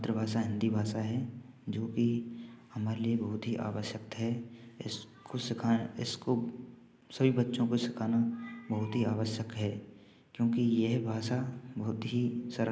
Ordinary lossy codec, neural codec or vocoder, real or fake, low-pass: none; none; real; none